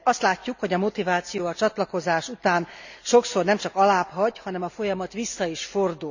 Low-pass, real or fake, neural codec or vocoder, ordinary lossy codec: 7.2 kHz; real; none; none